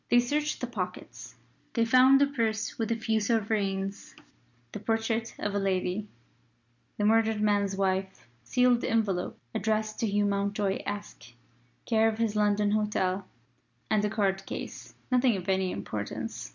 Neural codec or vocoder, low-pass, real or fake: none; 7.2 kHz; real